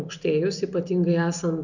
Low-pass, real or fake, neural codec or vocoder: 7.2 kHz; real; none